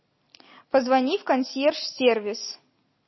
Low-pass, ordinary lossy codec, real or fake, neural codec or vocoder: 7.2 kHz; MP3, 24 kbps; real; none